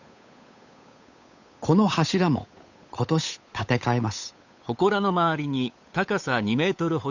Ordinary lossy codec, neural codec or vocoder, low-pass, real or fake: none; codec, 16 kHz, 8 kbps, FunCodec, trained on Chinese and English, 25 frames a second; 7.2 kHz; fake